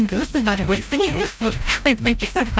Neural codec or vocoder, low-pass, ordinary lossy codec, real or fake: codec, 16 kHz, 0.5 kbps, FreqCodec, larger model; none; none; fake